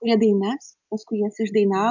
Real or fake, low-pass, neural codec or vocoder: real; 7.2 kHz; none